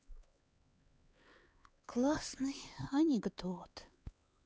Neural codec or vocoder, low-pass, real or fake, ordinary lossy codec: codec, 16 kHz, 4 kbps, X-Codec, HuBERT features, trained on LibriSpeech; none; fake; none